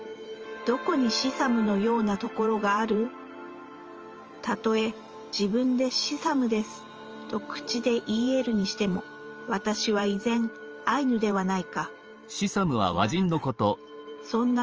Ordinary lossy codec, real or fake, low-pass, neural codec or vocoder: Opus, 24 kbps; fake; 7.2 kHz; vocoder, 44.1 kHz, 128 mel bands every 512 samples, BigVGAN v2